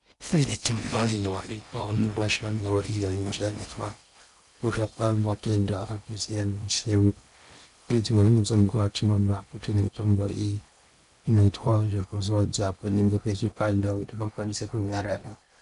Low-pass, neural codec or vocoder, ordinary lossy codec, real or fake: 10.8 kHz; codec, 16 kHz in and 24 kHz out, 0.6 kbps, FocalCodec, streaming, 4096 codes; MP3, 96 kbps; fake